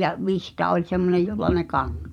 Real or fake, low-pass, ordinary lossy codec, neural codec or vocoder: fake; 19.8 kHz; none; vocoder, 44.1 kHz, 128 mel bands every 512 samples, BigVGAN v2